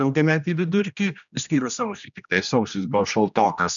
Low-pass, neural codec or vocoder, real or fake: 7.2 kHz; codec, 16 kHz, 1 kbps, X-Codec, HuBERT features, trained on general audio; fake